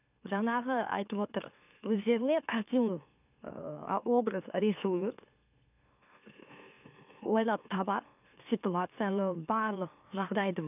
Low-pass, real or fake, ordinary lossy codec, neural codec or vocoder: 3.6 kHz; fake; none; autoencoder, 44.1 kHz, a latent of 192 numbers a frame, MeloTTS